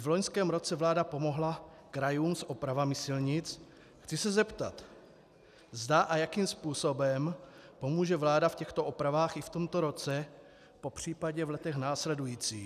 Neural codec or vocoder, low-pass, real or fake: none; 14.4 kHz; real